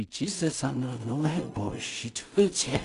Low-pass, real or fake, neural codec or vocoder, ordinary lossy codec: 10.8 kHz; fake; codec, 16 kHz in and 24 kHz out, 0.4 kbps, LongCat-Audio-Codec, two codebook decoder; none